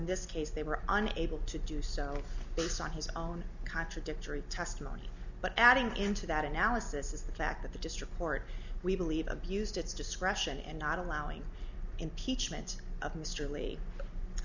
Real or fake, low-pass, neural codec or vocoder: real; 7.2 kHz; none